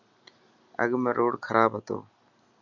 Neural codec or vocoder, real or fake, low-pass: none; real; 7.2 kHz